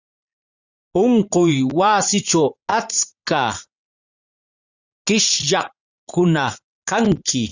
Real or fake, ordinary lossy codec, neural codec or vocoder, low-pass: fake; Opus, 64 kbps; vocoder, 44.1 kHz, 80 mel bands, Vocos; 7.2 kHz